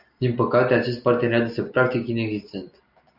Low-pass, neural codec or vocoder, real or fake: 5.4 kHz; none; real